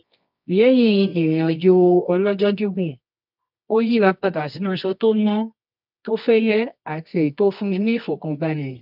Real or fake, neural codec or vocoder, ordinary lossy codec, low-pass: fake; codec, 24 kHz, 0.9 kbps, WavTokenizer, medium music audio release; none; 5.4 kHz